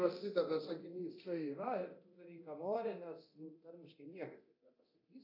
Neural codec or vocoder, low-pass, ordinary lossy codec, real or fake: codec, 24 kHz, 0.9 kbps, DualCodec; 5.4 kHz; AAC, 48 kbps; fake